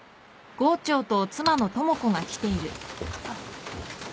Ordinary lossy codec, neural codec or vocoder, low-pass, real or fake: none; none; none; real